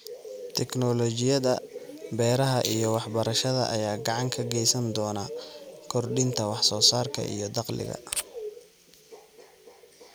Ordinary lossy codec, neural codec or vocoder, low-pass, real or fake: none; none; none; real